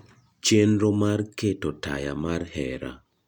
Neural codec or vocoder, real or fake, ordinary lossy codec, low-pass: none; real; none; 19.8 kHz